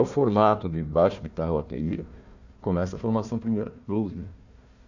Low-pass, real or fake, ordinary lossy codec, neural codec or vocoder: 7.2 kHz; fake; none; codec, 16 kHz, 1 kbps, FunCodec, trained on Chinese and English, 50 frames a second